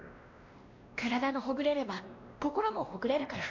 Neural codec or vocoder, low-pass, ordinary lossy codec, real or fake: codec, 16 kHz, 1 kbps, X-Codec, WavLM features, trained on Multilingual LibriSpeech; 7.2 kHz; AAC, 48 kbps; fake